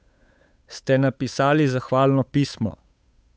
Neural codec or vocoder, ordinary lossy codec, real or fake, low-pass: codec, 16 kHz, 8 kbps, FunCodec, trained on Chinese and English, 25 frames a second; none; fake; none